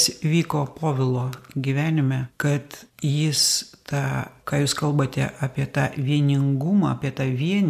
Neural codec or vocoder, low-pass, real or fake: none; 14.4 kHz; real